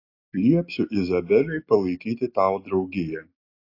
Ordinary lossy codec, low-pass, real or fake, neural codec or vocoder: AAC, 32 kbps; 5.4 kHz; real; none